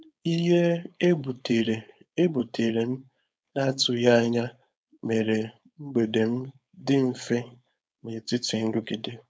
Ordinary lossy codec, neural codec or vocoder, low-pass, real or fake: none; codec, 16 kHz, 4.8 kbps, FACodec; none; fake